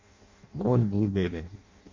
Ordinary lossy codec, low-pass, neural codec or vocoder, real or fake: MP3, 48 kbps; 7.2 kHz; codec, 16 kHz in and 24 kHz out, 0.6 kbps, FireRedTTS-2 codec; fake